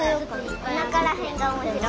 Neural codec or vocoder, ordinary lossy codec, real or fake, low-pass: none; none; real; none